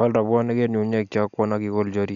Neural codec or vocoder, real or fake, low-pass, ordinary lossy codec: none; real; 7.2 kHz; none